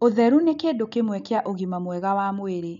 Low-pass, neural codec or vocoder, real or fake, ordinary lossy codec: 7.2 kHz; none; real; none